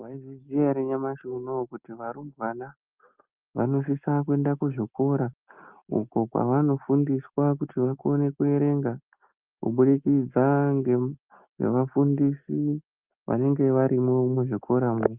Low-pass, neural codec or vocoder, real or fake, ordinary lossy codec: 3.6 kHz; none; real; Opus, 24 kbps